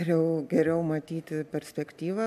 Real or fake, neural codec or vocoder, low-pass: real; none; 14.4 kHz